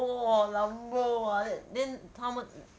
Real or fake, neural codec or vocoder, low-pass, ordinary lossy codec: real; none; none; none